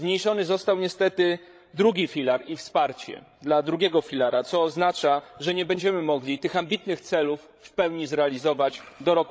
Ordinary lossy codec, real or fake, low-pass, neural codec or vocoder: none; fake; none; codec, 16 kHz, 16 kbps, FreqCodec, larger model